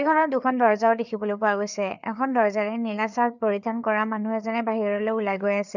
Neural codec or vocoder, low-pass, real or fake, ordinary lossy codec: codec, 16 kHz, 4 kbps, FreqCodec, larger model; 7.2 kHz; fake; none